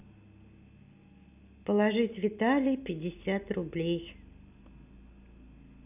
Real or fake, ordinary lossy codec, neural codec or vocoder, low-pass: real; none; none; 3.6 kHz